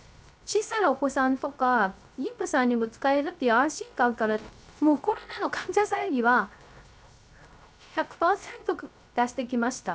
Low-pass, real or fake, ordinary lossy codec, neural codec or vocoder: none; fake; none; codec, 16 kHz, 0.3 kbps, FocalCodec